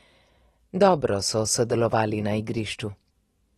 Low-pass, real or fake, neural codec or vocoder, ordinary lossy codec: 19.8 kHz; real; none; AAC, 32 kbps